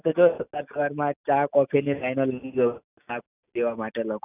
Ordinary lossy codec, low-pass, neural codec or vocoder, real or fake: none; 3.6 kHz; none; real